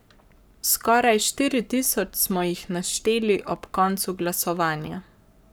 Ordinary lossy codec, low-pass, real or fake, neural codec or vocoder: none; none; fake; codec, 44.1 kHz, 7.8 kbps, Pupu-Codec